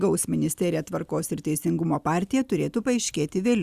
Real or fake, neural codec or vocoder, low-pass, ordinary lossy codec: real; none; 14.4 kHz; Opus, 64 kbps